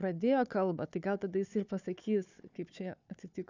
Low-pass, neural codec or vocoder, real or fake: 7.2 kHz; codec, 16 kHz, 4 kbps, FunCodec, trained on Chinese and English, 50 frames a second; fake